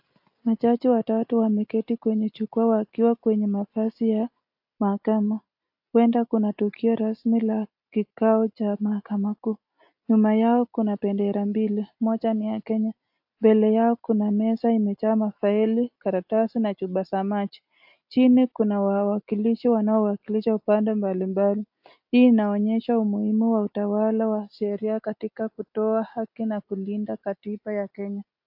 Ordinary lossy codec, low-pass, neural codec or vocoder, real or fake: AAC, 48 kbps; 5.4 kHz; none; real